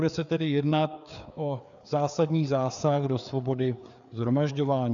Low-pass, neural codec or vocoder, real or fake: 7.2 kHz; codec, 16 kHz, 4 kbps, FreqCodec, larger model; fake